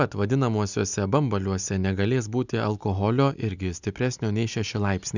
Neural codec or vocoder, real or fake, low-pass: none; real; 7.2 kHz